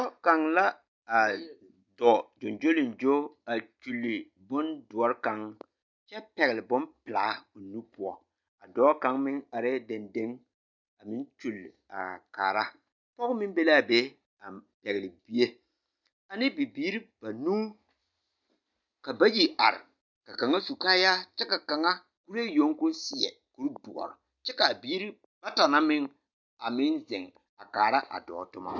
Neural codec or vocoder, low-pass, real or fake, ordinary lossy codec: none; 7.2 kHz; real; MP3, 64 kbps